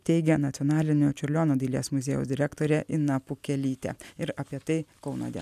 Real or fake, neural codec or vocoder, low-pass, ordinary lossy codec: real; none; 14.4 kHz; MP3, 96 kbps